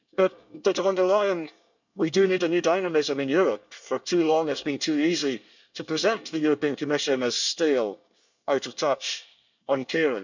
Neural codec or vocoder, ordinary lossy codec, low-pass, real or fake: codec, 24 kHz, 1 kbps, SNAC; none; 7.2 kHz; fake